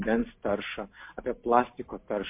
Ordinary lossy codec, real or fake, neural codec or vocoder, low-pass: MP3, 32 kbps; real; none; 3.6 kHz